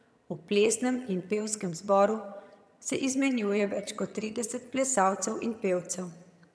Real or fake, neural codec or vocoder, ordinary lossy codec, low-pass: fake; vocoder, 22.05 kHz, 80 mel bands, HiFi-GAN; none; none